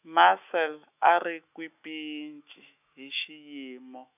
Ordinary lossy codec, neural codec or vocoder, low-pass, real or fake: none; autoencoder, 48 kHz, 128 numbers a frame, DAC-VAE, trained on Japanese speech; 3.6 kHz; fake